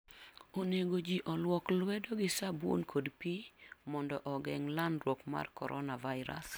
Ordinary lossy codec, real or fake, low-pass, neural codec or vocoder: none; real; none; none